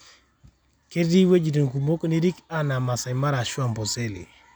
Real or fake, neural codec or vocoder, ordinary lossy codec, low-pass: real; none; none; none